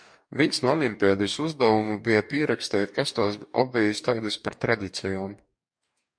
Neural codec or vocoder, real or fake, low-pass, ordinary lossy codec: codec, 44.1 kHz, 2.6 kbps, DAC; fake; 9.9 kHz; MP3, 64 kbps